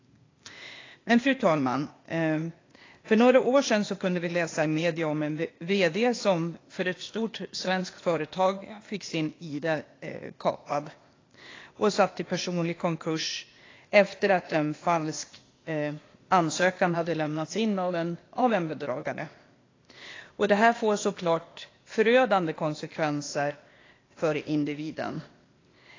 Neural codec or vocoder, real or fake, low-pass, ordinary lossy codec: codec, 16 kHz, 0.8 kbps, ZipCodec; fake; 7.2 kHz; AAC, 32 kbps